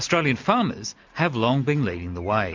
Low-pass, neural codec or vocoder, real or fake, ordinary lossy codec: 7.2 kHz; none; real; MP3, 64 kbps